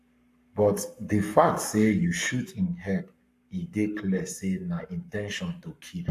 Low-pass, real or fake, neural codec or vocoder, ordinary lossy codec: 14.4 kHz; fake; codec, 44.1 kHz, 7.8 kbps, Pupu-Codec; AAC, 64 kbps